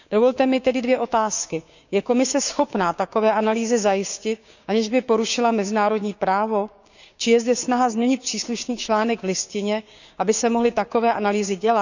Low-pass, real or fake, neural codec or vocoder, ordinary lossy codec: 7.2 kHz; fake; codec, 16 kHz, 6 kbps, DAC; none